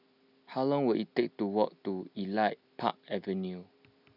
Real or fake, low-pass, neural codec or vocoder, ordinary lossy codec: real; 5.4 kHz; none; none